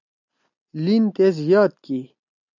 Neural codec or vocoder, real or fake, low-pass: none; real; 7.2 kHz